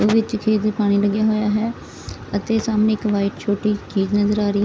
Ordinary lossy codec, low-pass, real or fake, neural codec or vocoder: none; none; real; none